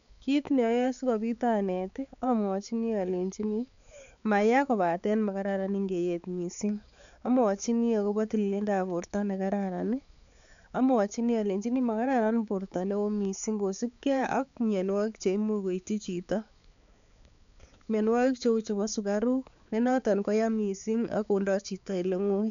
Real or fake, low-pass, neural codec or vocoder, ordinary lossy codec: fake; 7.2 kHz; codec, 16 kHz, 4 kbps, X-Codec, HuBERT features, trained on balanced general audio; none